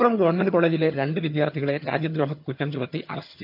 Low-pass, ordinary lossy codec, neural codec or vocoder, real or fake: 5.4 kHz; MP3, 48 kbps; vocoder, 22.05 kHz, 80 mel bands, HiFi-GAN; fake